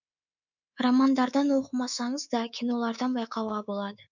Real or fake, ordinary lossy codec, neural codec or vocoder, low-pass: fake; none; codec, 24 kHz, 3.1 kbps, DualCodec; 7.2 kHz